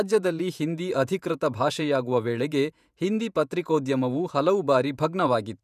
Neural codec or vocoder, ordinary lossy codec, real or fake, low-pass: vocoder, 48 kHz, 128 mel bands, Vocos; none; fake; 14.4 kHz